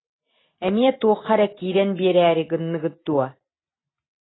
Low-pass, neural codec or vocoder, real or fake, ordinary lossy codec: 7.2 kHz; none; real; AAC, 16 kbps